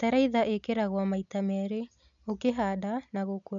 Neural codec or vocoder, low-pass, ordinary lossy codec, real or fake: none; 7.2 kHz; none; real